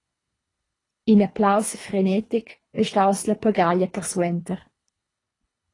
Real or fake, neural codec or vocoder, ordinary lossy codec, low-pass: fake; codec, 24 kHz, 3 kbps, HILCodec; AAC, 32 kbps; 10.8 kHz